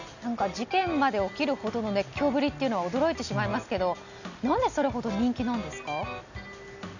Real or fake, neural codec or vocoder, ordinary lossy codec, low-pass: real; none; none; 7.2 kHz